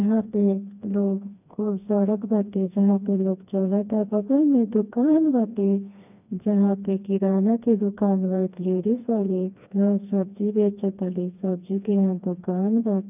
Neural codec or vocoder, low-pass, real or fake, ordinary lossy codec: codec, 16 kHz, 2 kbps, FreqCodec, smaller model; 3.6 kHz; fake; none